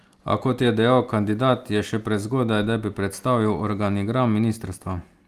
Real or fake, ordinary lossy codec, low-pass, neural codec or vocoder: real; Opus, 32 kbps; 14.4 kHz; none